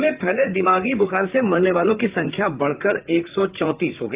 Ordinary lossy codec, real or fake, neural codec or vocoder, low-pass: Opus, 32 kbps; fake; vocoder, 44.1 kHz, 128 mel bands, Pupu-Vocoder; 3.6 kHz